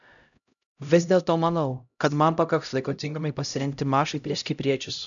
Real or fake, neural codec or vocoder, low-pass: fake; codec, 16 kHz, 0.5 kbps, X-Codec, HuBERT features, trained on LibriSpeech; 7.2 kHz